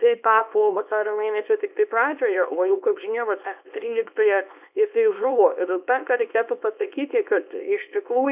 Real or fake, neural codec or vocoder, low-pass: fake; codec, 24 kHz, 0.9 kbps, WavTokenizer, small release; 3.6 kHz